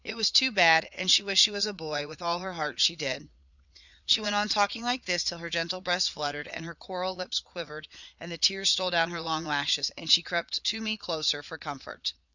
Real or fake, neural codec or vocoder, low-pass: fake; vocoder, 22.05 kHz, 80 mel bands, Vocos; 7.2 kHz